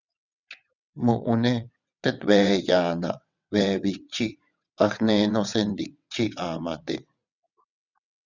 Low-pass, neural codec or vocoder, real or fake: 7.2 kHz; vocoder, 22.05 kHz, 80 mel bands, WaveNeXt; fake